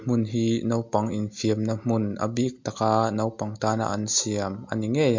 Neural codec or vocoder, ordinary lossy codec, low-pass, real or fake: none; MP3, 48 kbps; 7.2 kHz; real